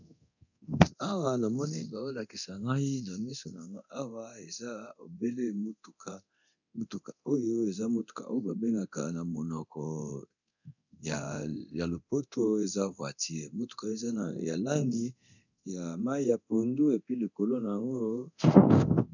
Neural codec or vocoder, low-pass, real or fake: codec, 24 kHz, 0.9 kbps, DualCodec; 7.2 kHz; fake